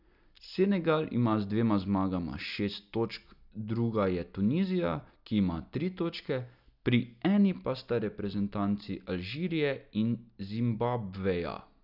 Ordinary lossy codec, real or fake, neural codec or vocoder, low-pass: none; real; none; 5.4 kHz